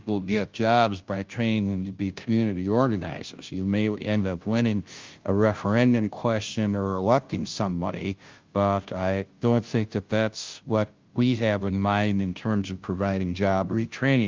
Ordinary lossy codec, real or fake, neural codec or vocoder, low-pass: Opus, 24 kbps; fake; codec, 16 kHz, 0.5 kbps, FunCodec, trained on Chinese and English, 25 frames a second; 7.2 kHz